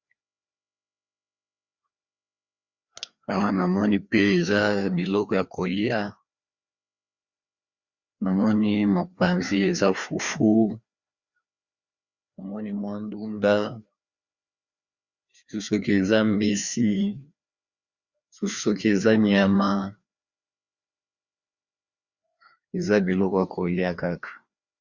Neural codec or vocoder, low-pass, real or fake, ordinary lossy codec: codec, 16 kHz, 2 kbps, FreqCodec, larger model; 7.2 kHz; fake; Opus, 64 kbps